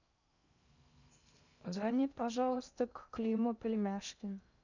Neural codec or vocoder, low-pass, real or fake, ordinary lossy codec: codec, 16 kHz in and 24 kHz out, 0.6 kbps, FocalCodec, streaming, 2048 codes; 7.2 kHz; fake; none